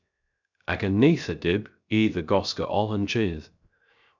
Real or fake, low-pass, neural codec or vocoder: fake; 7.2 kHz; codec, 16 kHz, 0.3 kbps, FocalCodec